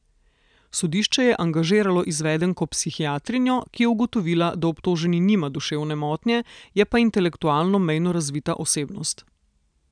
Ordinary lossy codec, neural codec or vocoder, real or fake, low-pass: none; none; real; 9.9 kHz